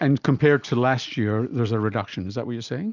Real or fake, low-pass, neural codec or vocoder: real; 7.2 kHz; none